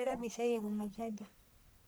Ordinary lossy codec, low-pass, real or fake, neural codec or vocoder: none; none; fake; codec, 44.1 kHz, 1.7 kbps, Pupu-Codec